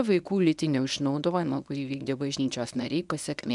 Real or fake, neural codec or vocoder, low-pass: fake; codec, 24 kHz, 0.9 kbps, WavTokenizer, medium speech release version 1; 10.8 kHz